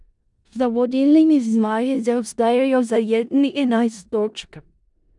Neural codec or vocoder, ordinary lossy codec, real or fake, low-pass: codec, 16 kHz in and 24 kHz out, 0.4 kbps, LongCat-Audio-Codec, four codebook decoder; none; fake; 10.8 kHz